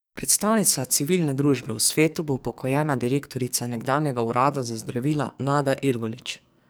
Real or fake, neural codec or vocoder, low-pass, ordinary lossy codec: fake; codec, 44.1 kHz, 2.6 kbps, SNAC; none; none